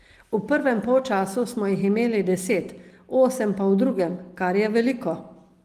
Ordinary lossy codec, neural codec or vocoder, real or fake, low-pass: Opus, 24 kbps; none; real; 14.4 kHz